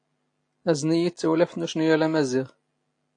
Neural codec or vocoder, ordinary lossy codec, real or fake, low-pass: none; AAC, 48 kbps; real; 9.9 kHz